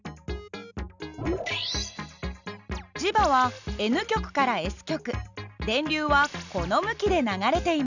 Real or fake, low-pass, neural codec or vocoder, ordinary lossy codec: real; 7.2 kHz; none; none